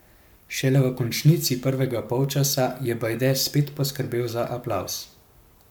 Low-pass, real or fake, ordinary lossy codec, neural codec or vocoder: none; fake; none; codec, 44.1 kHz, 7.8 kbps, Pupu-Codec